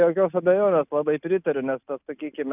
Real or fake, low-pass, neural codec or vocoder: real; 3.6 kHz; none